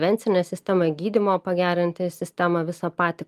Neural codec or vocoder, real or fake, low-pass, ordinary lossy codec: none; real; 14.4 kHz; Opus, 32 kbps